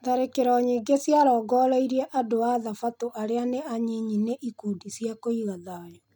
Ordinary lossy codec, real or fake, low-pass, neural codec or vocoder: none; real; 19.8 kHz; none